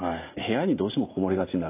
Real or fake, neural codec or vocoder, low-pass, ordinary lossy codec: real; none; 3.6 kHz; AAC, 24 kbps